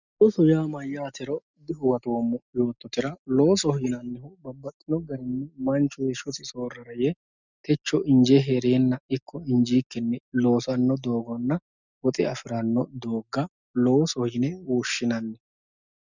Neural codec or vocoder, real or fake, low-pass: none; real; 7.2 kHz